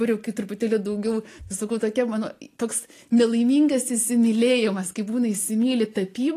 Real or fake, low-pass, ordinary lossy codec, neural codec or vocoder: real; 14.4 kHz; AAC, 48 kbps; none